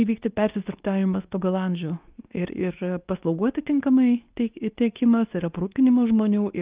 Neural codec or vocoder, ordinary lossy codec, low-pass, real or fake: codec, 24 kHz, 0.9 kbps, WavTokenizer, medium speech release version 2; Opus, 32 kbps; 3.6 kHz; fake